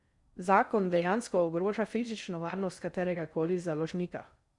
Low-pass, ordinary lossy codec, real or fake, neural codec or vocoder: 10.8 kHz; Opus, 64 kbps; fake; codec, 16 kHz in and 24 kHz out, 0.6 kbps, FocalCodec, streaming, 4096 codes